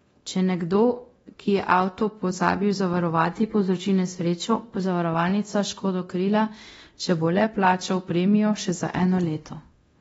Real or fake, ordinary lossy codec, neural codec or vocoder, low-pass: fake; AAC, 24 kbps; codec, 24 kHz, 0.9 kbps, DualCodec; 10.8 kHz